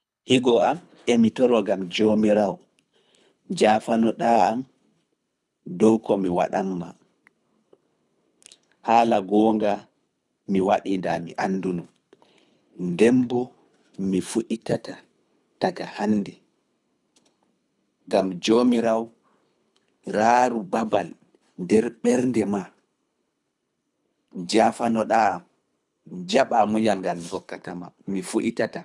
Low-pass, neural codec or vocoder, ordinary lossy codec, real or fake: none; codec, 24 kHz, 3 kbps, HILCodec; none; fake